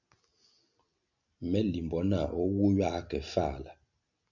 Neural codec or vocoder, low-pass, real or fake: none; 7.2 kHz; real